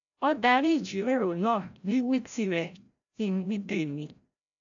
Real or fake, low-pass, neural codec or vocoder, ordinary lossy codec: fake; 7.2 kHz; codec, 16 kHz, 0.5 kbps, FreqCodec, larger model; AAC, 64 kbps